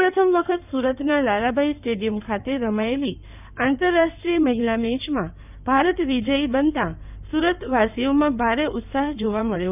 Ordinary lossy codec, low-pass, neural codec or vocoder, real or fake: none; 3.6 kHz; codec, 16 kHz in and 24 kHz out, 2.2 kbps, FireRedTTS-2 codec; fake